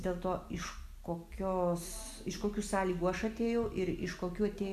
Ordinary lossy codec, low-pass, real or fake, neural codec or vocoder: AAC, 96 kbps; 14.4 kHz; real; none